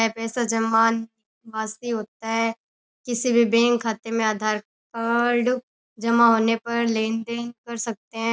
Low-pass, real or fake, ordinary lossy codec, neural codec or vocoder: none; real; none; none